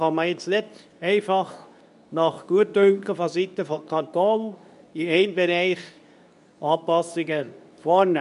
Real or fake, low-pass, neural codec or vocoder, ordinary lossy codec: fake; 10.8 kHz; codec, 24 kHz, 0.9 kbps, WavTokenizer, medium speech release version 2; none